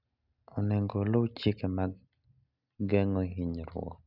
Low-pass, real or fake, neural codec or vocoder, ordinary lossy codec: 5.4 kHz; real; none; none